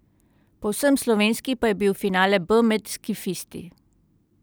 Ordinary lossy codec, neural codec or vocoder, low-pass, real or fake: none; none; none; real